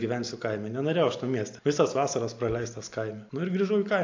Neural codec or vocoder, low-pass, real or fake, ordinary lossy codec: none; 7.2 kHz; real; AAC, 48 kbps